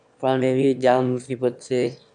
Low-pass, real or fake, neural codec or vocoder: 9.9 kHz; fake; autoencoder, 22.05 kHz, a latent of 192 numbers a frame, VITS, trained on one speaker